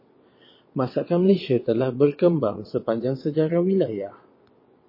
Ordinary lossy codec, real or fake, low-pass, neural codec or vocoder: MP3, 24 kbps; fake; 5.4 kHz; vocoder, 22.05 kHz, 80 mel bands, WaveNeXt